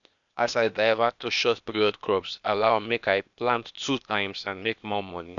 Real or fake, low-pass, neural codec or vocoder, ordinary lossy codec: fake; 7.2 kHz; codec, 16 kHz, 0.8 kbps, ZipCodec; none